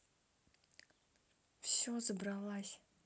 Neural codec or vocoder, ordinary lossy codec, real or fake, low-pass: none; none; real; none